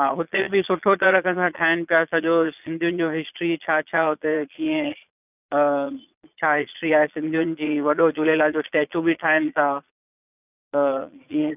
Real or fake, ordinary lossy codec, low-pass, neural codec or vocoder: fake; none; 3.6 kHz; vocoder, 22.05 kHz, 80 mel bands, Vocos